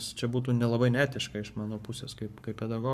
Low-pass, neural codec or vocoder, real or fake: 14.4 kHz; codec, 44.1 kHz, 7.8 kbps, Pupu-Codec; fake